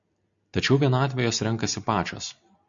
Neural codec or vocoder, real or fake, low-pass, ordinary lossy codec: none; real; 7.2 kHz; MP3, 64 kbps